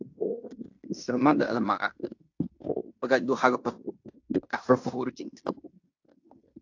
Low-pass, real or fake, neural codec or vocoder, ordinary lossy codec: 7.2 kHz; fake; codec, 16 kHz in and 24 kHz out, 0.9 kbps, LongCat-Audio-Codec, fine tuned four codebook decoder; AAC, 48 kbps